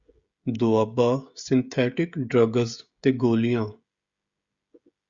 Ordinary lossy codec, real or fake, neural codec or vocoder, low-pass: Opus, 64 kbps; fake; codec, 16 kHz, 16 kbps, FreqCodec, smaller model; 7.2 kHz